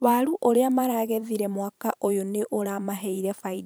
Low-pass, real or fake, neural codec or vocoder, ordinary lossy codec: none; fake; vocoder, 44.1 kHz, 128 mel bands every 512 samples, BigVGAN v2; none